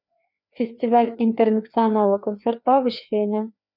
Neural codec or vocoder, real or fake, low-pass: codec, 16 kHz, 2 kbps, FreqCodec, larger model; fake; 5.4 kHz